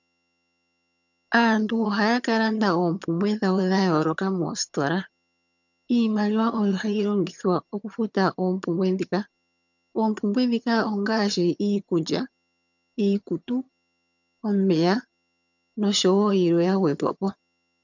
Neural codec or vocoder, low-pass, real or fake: vocoder, 22.05 kHz, 80 mel bands, HiFi-GAN; 7.2 kHz; fake